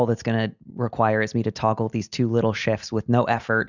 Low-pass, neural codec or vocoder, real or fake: 7.2 kHz; none; real